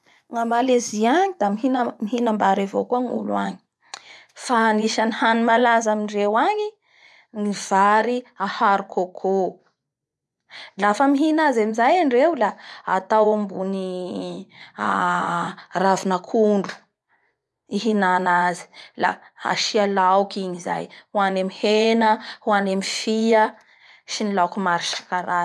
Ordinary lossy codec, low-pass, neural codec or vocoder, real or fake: none; none; vocoder, 24 kHz, 100 mel bands, Vocos; fake